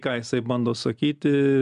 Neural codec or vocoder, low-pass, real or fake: none; 10.8 kHz; real